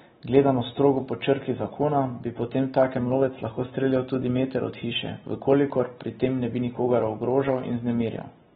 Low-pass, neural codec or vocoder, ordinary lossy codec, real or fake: 7.2 kHz; none; AAC, 16 kbps; real